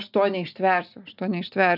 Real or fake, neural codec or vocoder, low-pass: real; none; 5.4 kHz